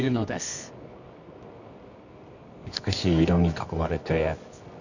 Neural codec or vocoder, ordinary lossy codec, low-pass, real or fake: codec, 24 kHz, 0.9 kbps, WavTokenizer, medium music audio release; none; 7.2 kHz; fake